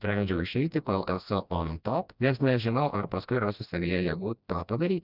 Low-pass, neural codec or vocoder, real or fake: 5.4 kHz; codec, 16 kHz, 1 kbps, FreqCodec, smaller model; fake